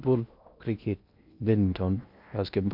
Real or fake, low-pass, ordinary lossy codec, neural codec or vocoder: fake; 5.4 kHz; none; codec, 16 kHz in and 24 kHz out, 0.8 kbps, FocalCodec, streaming, 65536 codes